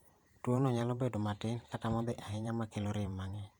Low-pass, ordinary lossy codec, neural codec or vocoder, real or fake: 19.8 kHz; Opus, 64 kbps; vocoder, 44.1 kHz, 128 mel bands every 512 samples, BigVGAN v2; fake